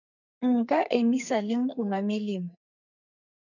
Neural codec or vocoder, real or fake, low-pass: codec, 44.1 kHz, 2.6 kbps, SNAC; fake; 7.2 kHz